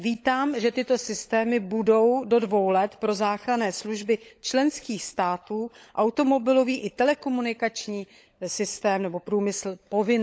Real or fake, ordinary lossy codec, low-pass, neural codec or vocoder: fake; none; none; codec, 16 kHz, 16 kbps, FunCodec, trained on LibriTTS, 50 frames a second